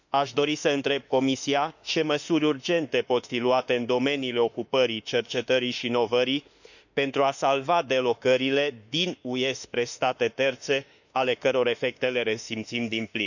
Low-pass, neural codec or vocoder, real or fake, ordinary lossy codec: 7.2 kHz; autoencoder, 48 kHz, 32 numbers a frame, DAC-VAE, trained on Japanese speech; fake; none